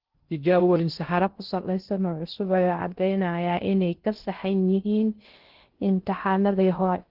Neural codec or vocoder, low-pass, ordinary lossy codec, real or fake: codec, 16 kHz in and 24 kHz out, 0.6 kbps, FocalCodec, streaming, 2048 codes; 5.4 kHz; Opus, 16 kbps; fake